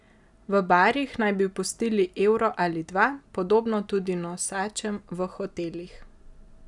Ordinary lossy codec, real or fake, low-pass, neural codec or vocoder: none; real; 10.8 kHz; none